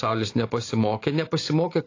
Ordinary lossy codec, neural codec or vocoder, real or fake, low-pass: AAC, 32 kbps; none; real; 7.2 kHz